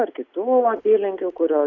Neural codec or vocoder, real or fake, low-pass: none; real; 7.2 kHz